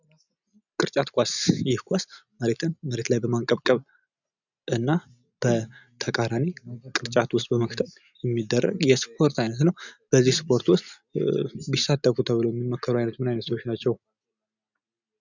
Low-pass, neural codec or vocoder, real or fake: 7.2 kHz; none; real